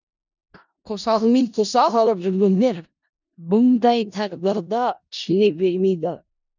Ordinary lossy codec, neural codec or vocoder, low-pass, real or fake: none; codec, 16 kHz in and 24 kHz out, 0.4 kbps, LongCat-Audio-Codec, four codebook decoder; 7.2 kHz; fake